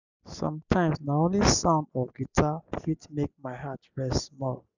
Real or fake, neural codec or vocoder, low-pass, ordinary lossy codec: real; none; 7.2 kHz; none